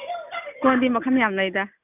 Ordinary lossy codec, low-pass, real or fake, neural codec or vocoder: none; 3.6 kHz; real; none